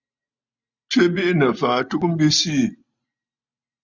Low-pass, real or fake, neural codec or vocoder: 7.2 kHz; real; none